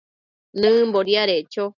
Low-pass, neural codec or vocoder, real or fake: 7.2 kHz; none; real